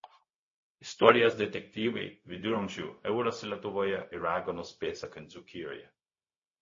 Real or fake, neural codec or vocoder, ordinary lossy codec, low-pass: fake; codec, 16 kHz, 0.4 kbps, LongCat-Audio-Codec; MP3, 32 kbps; 7.2 kHz